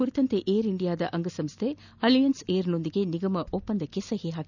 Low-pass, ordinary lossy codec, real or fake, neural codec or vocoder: 7.2 kHz; none; real; none